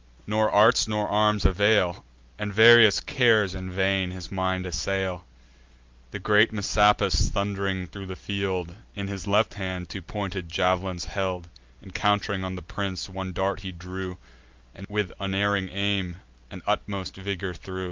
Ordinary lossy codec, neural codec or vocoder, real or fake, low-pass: Opus, 32 kbps; none; real; 7.2 kHz